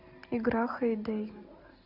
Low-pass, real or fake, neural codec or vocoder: 5.4 kHz; real; none